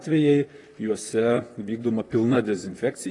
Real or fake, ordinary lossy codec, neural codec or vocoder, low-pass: fake; AAC, 32 kbps; vocoder, 44.1 kHz, 128 mel bands, Pupu-Vocoder; 10.8 kHz